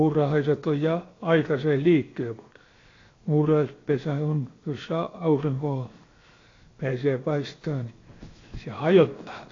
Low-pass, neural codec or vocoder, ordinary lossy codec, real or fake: 7.2 kHz; codec, 16 kHz, 0.7 kbps, FocalCodec; AAC, 32 kbps; fake